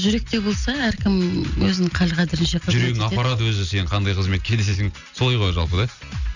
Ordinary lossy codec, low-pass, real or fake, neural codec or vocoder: none; 7.2 kHz; real; none